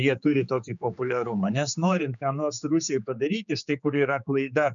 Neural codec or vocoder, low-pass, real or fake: codec, 16 kHz, 4 kbps, X-Codec, HuBERT features, trained on general audio; 7.2 kHz; fake